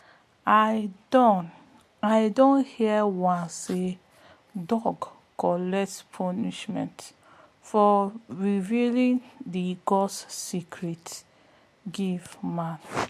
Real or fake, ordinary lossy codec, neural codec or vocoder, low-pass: real; MP3, 64 kbps; none; 14.4 kHz